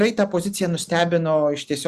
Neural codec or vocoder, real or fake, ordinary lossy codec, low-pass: none; real; Opus, 64 kbps; 14.4 kHz